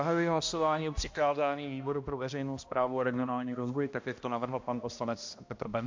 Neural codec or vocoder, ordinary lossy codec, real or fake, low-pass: codec, 16 kHz, 1 kbps, X-Codec, HuBERT features, trained on balanced general audio; MP3, 48 kbps; fake; 7.2 kHz